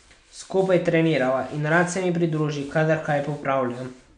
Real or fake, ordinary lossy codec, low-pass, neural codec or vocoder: real; none; 9.9 kHz; none